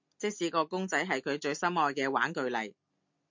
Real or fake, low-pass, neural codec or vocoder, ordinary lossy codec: real; 7.2 kHz; none; MP3, 48 kbps